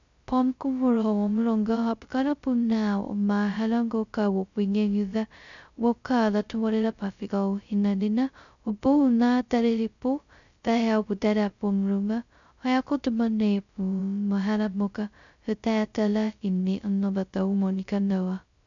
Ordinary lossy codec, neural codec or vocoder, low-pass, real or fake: MP3, 96 kbps; codec, 16 kHz, 0.2 kbps, FocalCodec; 7.2 kHz; fake